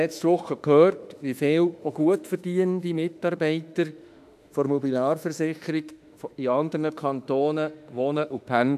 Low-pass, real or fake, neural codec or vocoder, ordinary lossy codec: 14.4 kHz; fake; autoencoder, 48 kHz, 32 numbers a frame, DAC-VAE, trained on Japanese speech; none